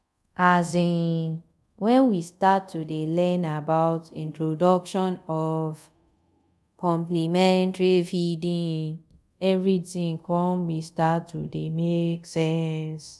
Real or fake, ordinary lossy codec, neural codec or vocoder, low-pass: fake; none; codec, 24 kHz, 0.5 kbps, DualCodec; none